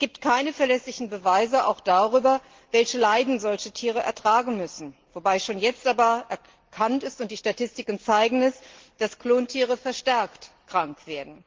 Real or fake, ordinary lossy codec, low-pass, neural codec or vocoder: real; Opus, 16 kbps; 7.2 kHz; none